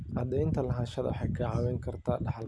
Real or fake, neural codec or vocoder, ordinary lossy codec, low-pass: real; none; none; none